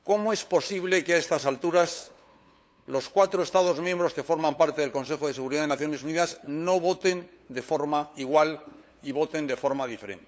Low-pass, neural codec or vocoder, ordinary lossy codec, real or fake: none; codec, 16 kHz, 8 kbps, FunCodec, trained on LibriTTS, 25 frames a second; none; fake